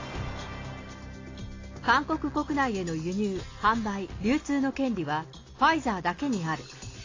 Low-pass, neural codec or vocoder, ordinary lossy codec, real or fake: 7.2 kHz; none; AAC, 32 kbps; real